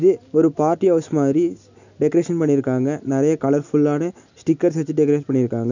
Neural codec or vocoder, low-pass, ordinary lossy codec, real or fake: none; 7.2 kHz; none; real